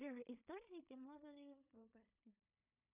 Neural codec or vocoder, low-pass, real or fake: codec, 16 kHz in and 24 kHz out, 0.4 kbps, LongCat-Audio-Codec, two codebook decoder; 3.6 kHz; fake